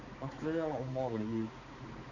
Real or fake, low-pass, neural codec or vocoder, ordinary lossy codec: fake; 7.2 kHz; codec, 16 kHz, 4 kbps, X-Codec, HuBERT features, trained on balanced general audio; none